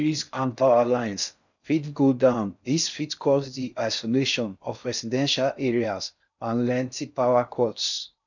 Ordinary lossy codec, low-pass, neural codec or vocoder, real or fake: none; 7.2 kHz; codec, 16 kHz in and 24 kHz out, 0.6 kbps, FocalCodec, streaming, 4096 codes; fake